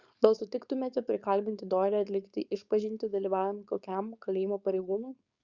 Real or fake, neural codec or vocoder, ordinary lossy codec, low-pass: fake; codec, 16 kHz, 4.8 kbps, FACodec; Opus, 64 kbps; 7.2 kHz